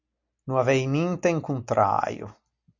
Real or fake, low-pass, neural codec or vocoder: real; 7.2 kHz; none